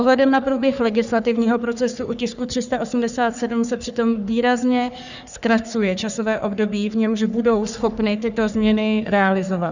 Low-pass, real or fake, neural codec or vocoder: 7.2 kHz; fake; codec, 44.1 kHz, 3.4 kbps, Pupu-Codec